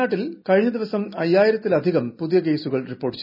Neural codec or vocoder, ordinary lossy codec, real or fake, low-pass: none; none; real; 5.4 kHz